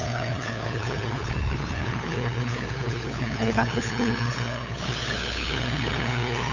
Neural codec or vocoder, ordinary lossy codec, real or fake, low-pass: codec, 16 kHz, 8 kbps, FunCodec, trained on LibriTTS, 25 frames a second; none; fake; 7.2 kHz